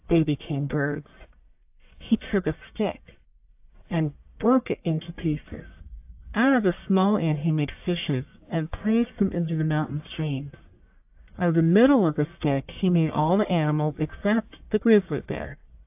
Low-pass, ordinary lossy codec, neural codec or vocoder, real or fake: 3.6 kHz; AAC, 32 kbps; codec, 44.1 kHz, 1.7 kbps, Pupu-Codec; fake